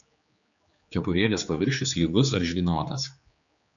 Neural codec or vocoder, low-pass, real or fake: codec, 16 kHz, 4 kbps, X-Codec, HuBERT features, trained on general audio; 7.2 kHz; fake